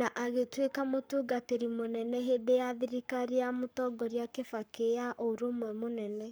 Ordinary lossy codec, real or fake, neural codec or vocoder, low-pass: none; fake; codec, 44.1 kHz, 7.8 kbps, Pupu-Codec; none